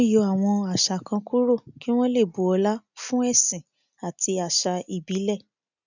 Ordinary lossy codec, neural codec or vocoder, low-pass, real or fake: none; none; 7.2 kHz; real